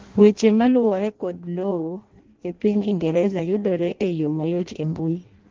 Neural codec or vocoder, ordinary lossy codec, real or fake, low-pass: codec, 16 kHz in and 24 kHz out, 0.6 kbps, FireRedTTS-2 codec; Opus, 16 kbps; fake; 7.2 kHz